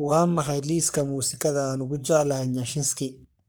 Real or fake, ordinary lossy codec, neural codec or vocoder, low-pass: fake; none; codec, 44.1 kHz, 3.4 kbps, Pupu-Codec; none